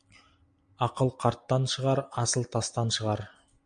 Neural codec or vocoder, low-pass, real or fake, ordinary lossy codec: none; 9.9 kHz; real; MP3, 64 kbps